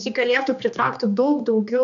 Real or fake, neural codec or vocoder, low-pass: fake; codec, 16 kHz, 2 kbps, X-Codec, HuBERT features, trained on general audio; 7.2 kHz